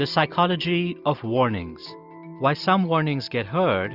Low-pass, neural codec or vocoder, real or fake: 5.4 kHz; none; real